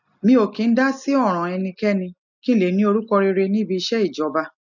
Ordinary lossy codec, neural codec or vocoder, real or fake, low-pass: none; none; real; 7.2 kHz